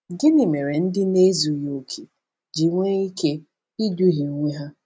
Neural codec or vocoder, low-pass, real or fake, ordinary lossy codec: none; none; real; none